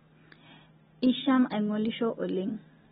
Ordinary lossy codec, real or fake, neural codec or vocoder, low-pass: AAC, 16 kbps; real; none; 10.8 kHz